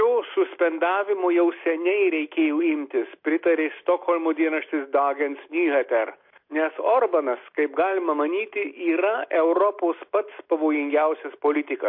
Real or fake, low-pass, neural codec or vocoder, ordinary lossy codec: real; 5.4 kHz; none; MP3, 32 kbps